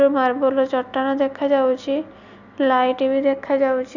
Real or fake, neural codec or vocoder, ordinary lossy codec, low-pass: real; none; none; 7.2 kHz